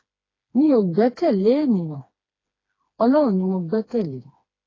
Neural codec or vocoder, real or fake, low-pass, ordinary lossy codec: codec, 16 kHz, 2 kbps, FreqCodec, smaller model; fake; 7.2 kHz; AAC, 32 kbps